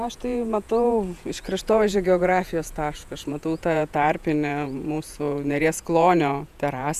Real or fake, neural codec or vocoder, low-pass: fake; vocoder, 48 kHz, 128 mel bands, Vocos; 14.4 kHz